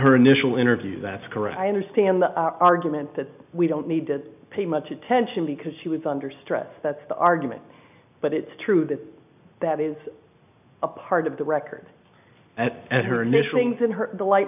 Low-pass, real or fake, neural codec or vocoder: 3.6 kHz; real; none